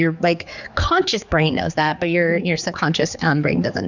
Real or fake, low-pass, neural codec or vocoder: fake; 7.2 kHz; codec, 16 kHz, 4 kbps, X-Codec, HuBERT features, trained on general audio